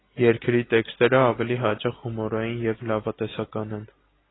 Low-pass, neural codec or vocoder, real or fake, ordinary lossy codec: 7.2 kHz; none; real; AAC, 16 kbps